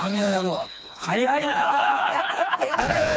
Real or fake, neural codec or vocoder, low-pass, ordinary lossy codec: fake; codec, 16 kHz, 2 kbps, FreqCodec, smaller model; none; none